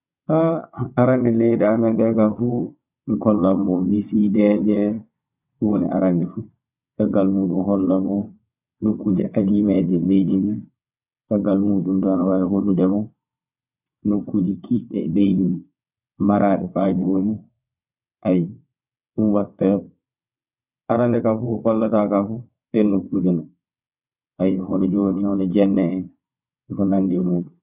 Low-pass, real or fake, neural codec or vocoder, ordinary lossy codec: 3.6 kHz; fake; vocoder, 22.05 kHz, 80 mel bands, WaveNeXt; none